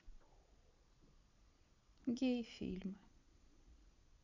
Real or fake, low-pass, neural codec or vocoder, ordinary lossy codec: fake; 7.2 kHz; vocoder, 22.05 kHz, 80 mel bands, Vocos; none